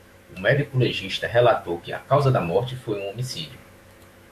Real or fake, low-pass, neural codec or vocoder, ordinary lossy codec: fake; 14.4 kHz; autoencoder, 48 kHz, 128 numbers a frame, DAC-VAE, trained on Japanese speech; MP3, 64 kbps